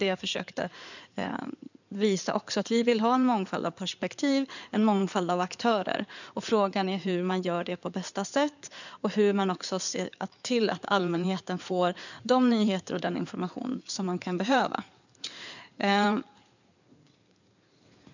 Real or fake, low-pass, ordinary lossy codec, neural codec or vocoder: fake; 7.2 kHz; none; codec, 16 kHz in and 24 kHz out, 2.2 kbps, FireRedTTS-2 codec